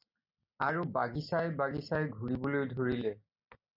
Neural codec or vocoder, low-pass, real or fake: none; 5.4 kHz; real